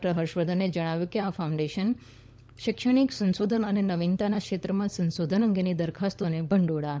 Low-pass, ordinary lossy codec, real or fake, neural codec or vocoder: none; none; fake; codec, 16 kHz, 16 kbps, FunCodec, trained on LibriTTS, 50 frames a second